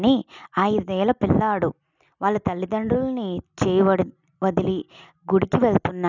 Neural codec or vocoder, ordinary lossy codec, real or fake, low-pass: none; none; real; 7.2 kHz